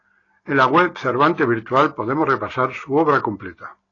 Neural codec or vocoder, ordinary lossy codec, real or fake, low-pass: none; AAC, 32 kbps; real; 7.2 kHz